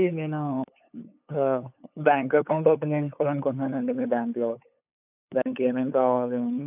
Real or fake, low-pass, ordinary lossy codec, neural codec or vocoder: fake; 3.6 kHz; AAC, 32 kbps; codec, 16 kHz, 8 kbps, FunCodec, trained on LibriTTS, 25 frames a second